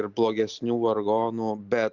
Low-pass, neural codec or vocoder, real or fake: 7.2 kHz; none; real